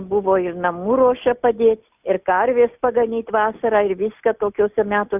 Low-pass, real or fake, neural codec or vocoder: 3.6 kHz; real; none